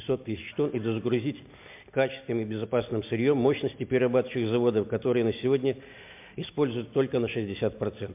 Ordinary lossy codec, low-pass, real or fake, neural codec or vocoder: MP3, 32 kbps; 3.6 kHz; real; none